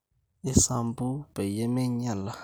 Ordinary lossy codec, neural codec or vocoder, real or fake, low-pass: none; none; real; none